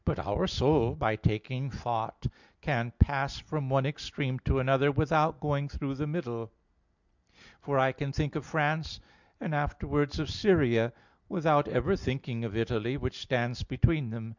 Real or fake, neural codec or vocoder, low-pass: real; none; 7.2 kHz